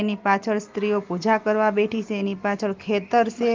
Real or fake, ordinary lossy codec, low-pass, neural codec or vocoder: real; Opus, 24 kbps; 7.2 kHz; none